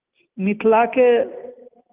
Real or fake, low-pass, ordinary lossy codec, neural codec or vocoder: real; 3.6 kHz; Opus, 24 kbps; none